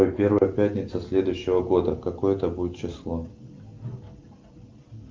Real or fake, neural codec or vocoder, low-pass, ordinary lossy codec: real; none; 7.2 kHz; Opus, 32 kbps